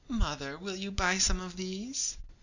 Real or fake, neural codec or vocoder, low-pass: real; none; 7.2 kHz